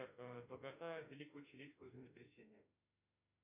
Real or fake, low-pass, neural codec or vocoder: fake; 3.6 kHz; autoencoder, 48 kHz, 32 numbers a frame, DAC-VAE, trained on Japanese speech